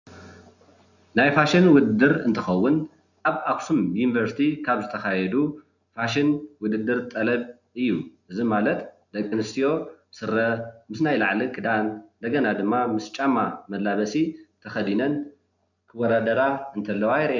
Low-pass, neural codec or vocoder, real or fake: 7.2 kHz; none; real